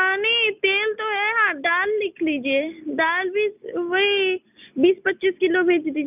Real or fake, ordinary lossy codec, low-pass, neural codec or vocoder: real; none; 3.6 kHz; none